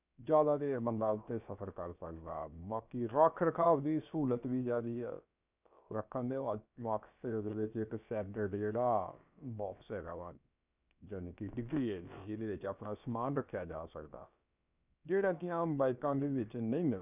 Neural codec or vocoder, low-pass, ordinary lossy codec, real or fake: codec, 16 kHz, about 1 kbps, DyCAST, with the encoder's durations; 3.6 kHz; none; fake